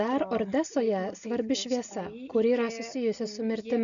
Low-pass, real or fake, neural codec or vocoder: 7.2 kHz; real; none